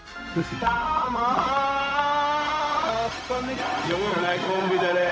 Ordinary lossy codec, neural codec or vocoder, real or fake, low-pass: none; codec, 16 kHz, 0.4 kbps, LongCat-Audio-Codec; fake; none